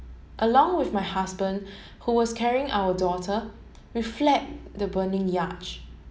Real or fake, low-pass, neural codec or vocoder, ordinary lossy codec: real; none; none; none